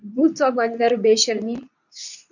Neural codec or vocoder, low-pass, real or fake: codec, 24 kHz, 0.9 kbps, WavTokenizer, medium speech release version 2; 7.2 kHz; fake